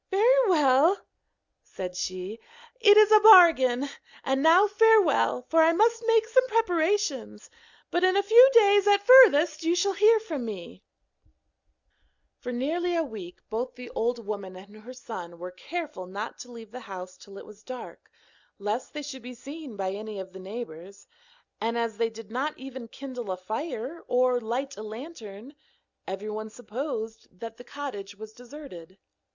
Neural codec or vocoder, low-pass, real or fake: none; 7.2 kHz; real